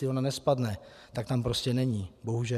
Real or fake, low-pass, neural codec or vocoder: fake; 14.4 kHz; vocoder, 44.1 kHz, 128 mel bands every 512 samples, BigVGAN v2